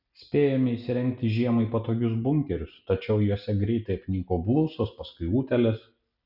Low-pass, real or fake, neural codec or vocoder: 5.4 kHz; real; none